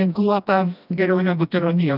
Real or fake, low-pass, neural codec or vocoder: fake; 5.4 kHz; codec, 16 kHz, 1 kbps, FreqCodec, smaller model